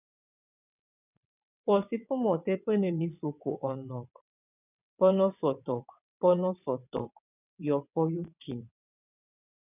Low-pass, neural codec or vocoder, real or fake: 3.6 kHz; vocoder, 22.05 kHz, 80 mel bands, WaveNeXt; fake